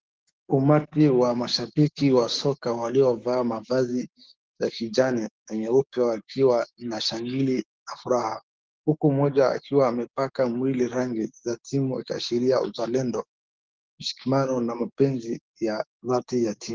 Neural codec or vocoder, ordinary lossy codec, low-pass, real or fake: codec, 16 kHz, 6 kbps, DAC; Opus, 16 kbps; 7.2 kHz; fake